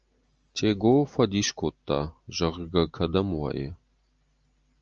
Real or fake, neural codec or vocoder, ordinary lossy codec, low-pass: real; none; Opus, 24 kbps; 7.2 kHz